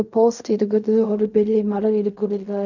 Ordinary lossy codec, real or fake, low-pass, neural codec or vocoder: none; fake; 7.2 kHz; codec, 16 kHz in and 24 kHz out, 0.4 kbps, LongCat-Audio-Codec, fine tuned four codebook decoder